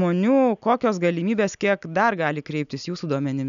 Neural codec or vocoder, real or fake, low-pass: none; real; 7.2 kHz